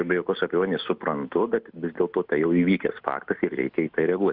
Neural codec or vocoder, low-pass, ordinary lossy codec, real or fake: none; 5.4 kHz; Opus, 64 kbps; real